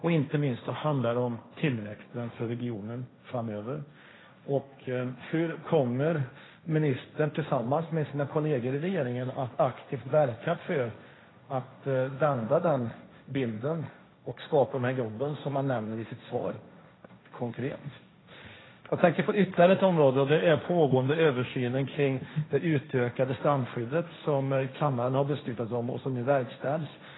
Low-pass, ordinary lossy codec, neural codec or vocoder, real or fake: 7.2 kHz; AAC, 16 kbps; codec, 16 kHz, 1.1 kbps, Voila-Tokenizer; fake